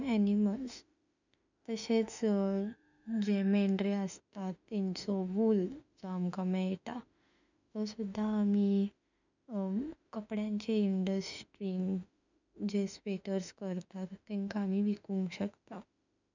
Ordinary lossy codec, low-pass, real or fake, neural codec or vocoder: none; 7.2 kHz; fake; autoencoder, 48 kHz, 32 numbers a frame, DAC-VAE, trained on Japanese speech